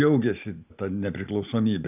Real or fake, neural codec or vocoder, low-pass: real; none; 3.6 kHz